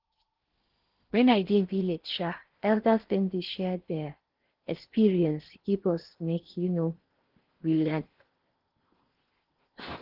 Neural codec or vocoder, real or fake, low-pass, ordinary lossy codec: codec, 16 kHz in and 24 kHz out, 0.8 kbps, FocalCodec, streaming, 65536 codes; fake; 5.4 kHz; Opus, 16 kbps